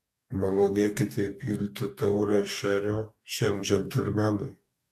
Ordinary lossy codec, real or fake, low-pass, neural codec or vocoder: AAC, 96 kbps; fake; 14.4 kHz; codec, 44.1 kHz, 2.6 kbps, DAC